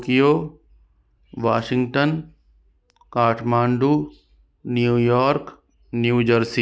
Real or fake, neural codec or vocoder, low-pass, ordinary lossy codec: real; none; none; none